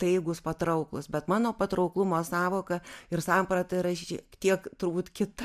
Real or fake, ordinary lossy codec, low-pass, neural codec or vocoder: real; MP3, 96 kbps; 14.4 kHz; none